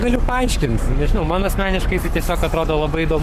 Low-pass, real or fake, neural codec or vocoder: 14.4 kHz; fake; codec, 44.1 kHz, 7.8 kbps, Pupu-Codec